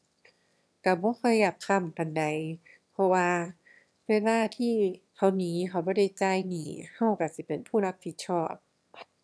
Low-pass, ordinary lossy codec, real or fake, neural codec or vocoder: none; none; fake; autoencoder, 22.05 kHz, a latent of 192 numbers a frame, VITS, trained on one speaker